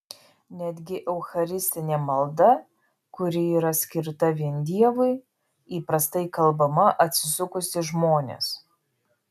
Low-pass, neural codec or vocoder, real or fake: 14.4 kHz; none; real